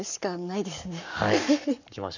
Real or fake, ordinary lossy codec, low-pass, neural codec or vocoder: fake; none; 7.2 kHz; codec, 44.1 kHz, 7.8 kbps, Pupu-Codec